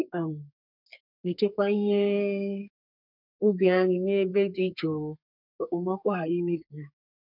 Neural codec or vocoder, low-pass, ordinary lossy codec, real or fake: codec, 32 kHz, 1.9 kbps, SNAC; 5.4 kHz; none; fake